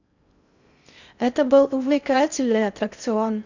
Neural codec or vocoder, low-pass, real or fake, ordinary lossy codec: codec, 16 kHz in and 24 kHz out, 0.6 kbps, FocalCodec, streaming, 2048 codes; 7.2 kHz; fake; none